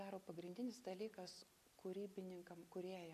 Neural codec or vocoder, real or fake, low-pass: none; real; 14.4 kHz